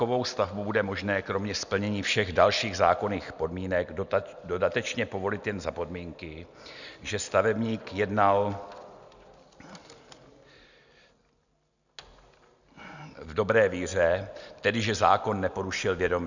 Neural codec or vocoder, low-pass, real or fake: none; 7.2 kHz; real